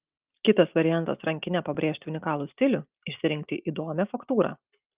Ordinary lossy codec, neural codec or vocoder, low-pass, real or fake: Opus, 24 kbps; none; 3.6 kHz; real